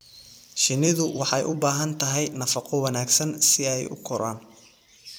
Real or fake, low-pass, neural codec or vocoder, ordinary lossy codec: fake; none; vocoder, 44.1 kHz, 128 mel bands every 256 samples, BigVGAN v2; none